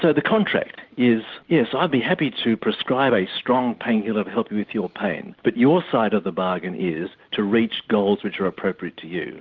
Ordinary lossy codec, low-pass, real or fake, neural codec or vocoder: Opus, 24 kbps; 7.2 kHz; real; none